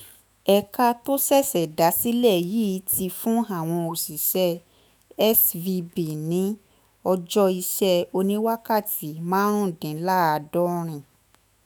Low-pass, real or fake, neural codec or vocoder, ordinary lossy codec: none; fake; autoencoder, 48 kHz, 128 numbers a frame, DAC-VAE, trained on Japanese speech; none